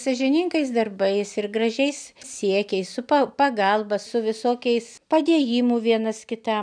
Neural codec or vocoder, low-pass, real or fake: none; 9.9 kHz; real